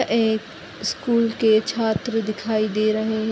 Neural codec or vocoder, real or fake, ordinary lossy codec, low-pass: none; real; none; none